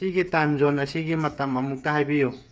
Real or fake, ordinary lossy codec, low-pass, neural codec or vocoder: fake; none; none; codec, 16 kHz, 8 kbps, FreqCodec, smaller model